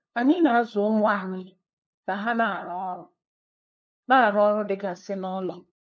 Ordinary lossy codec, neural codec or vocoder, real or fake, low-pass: none; codec, 16 kHz, 2 kbps, FunCodec, trained on LibriTTS, 25 frames a second; fake; none